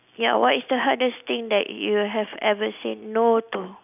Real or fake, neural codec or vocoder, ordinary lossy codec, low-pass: real; none; none; 3.6 kHz